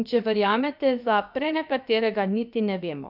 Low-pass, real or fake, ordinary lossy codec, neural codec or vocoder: 5.4 kHz; fake; none; codec, 16 kHz, about 1 kbps, DyCAST, with the encoder's durations